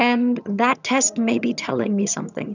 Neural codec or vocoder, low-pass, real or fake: vocoder, 22.05 kHz, 80 mel bands, HiFi-GAN; 7.2 kHz; fake